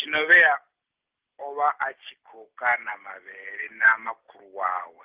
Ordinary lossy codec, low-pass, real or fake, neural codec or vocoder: Opus, 16 kbps; 3.6 kHz; real; none